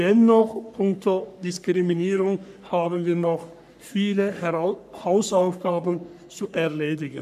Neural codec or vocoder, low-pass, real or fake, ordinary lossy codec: codec, 44.1 kHz, 3.4 kbps, Pupu-Codec; 14.4 kHz; fake; none